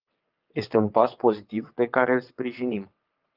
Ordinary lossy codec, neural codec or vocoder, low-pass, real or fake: Opus, 24 kbps; vocoder, 22.05 kHz, 80 mel bands, WaveNeXt; 5.4 kHz; fake